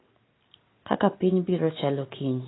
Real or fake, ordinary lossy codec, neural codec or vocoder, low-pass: real; AAC, 16 kbps; none; 7.2 kHz